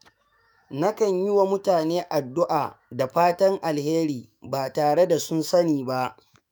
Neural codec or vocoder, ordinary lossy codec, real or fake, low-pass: autoencoder, 48 kHz, 128 numbers a frame, DAC-VAE, trained on Japanese speech; none; fake; none